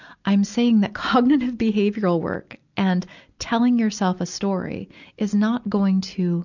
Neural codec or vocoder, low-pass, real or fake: none; 7.2 kHz; real